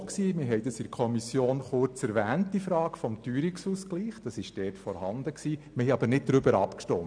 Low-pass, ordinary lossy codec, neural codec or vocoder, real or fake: 9.9 kHz; none; none; real